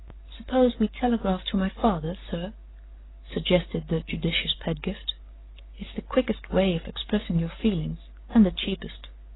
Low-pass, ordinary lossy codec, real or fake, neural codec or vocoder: 7.2 kHz; AAC, 16 kbps; real; none